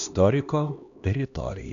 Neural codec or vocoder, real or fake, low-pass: codec, 16 kHz, 1 kbps, X-Codec, HuBERT features, trained on LibriSpeech; fake; 7.2 kHz